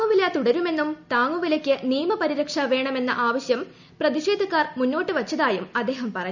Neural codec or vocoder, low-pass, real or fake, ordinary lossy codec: none; 7.2 kHz; real; none